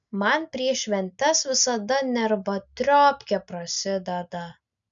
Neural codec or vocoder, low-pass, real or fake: none; 7.2 kHz; real